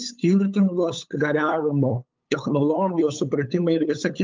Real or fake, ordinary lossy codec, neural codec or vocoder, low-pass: fake; Opus, 24 kbps; codec, 16 kHz, 8 kbps, FunCodec, trained on LibriTTS, 25 frames a second; 7.2 kHz